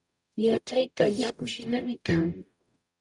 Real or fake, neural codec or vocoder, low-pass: fake; codec, 44.1 kHz, 0.9 kbps, DAC; 10.8 kHz